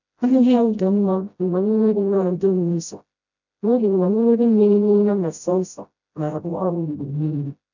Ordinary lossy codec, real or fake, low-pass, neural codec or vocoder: none; fake; 7.2 kHz; codec, 16 kHz, 0.5 kbps, FreqCodec, smaller model